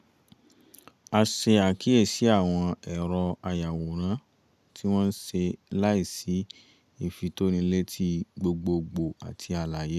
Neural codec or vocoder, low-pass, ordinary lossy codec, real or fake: none; 14.4 kHz; none; real